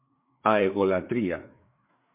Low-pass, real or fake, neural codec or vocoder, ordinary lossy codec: 3.6 kHz; fake; codec, 16 kHz, 4 kbps, FreqCodec, larger model; MP3, 24 kbps